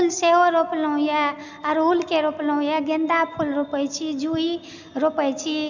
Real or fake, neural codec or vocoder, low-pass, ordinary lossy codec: real; none; 7.2 kHz; none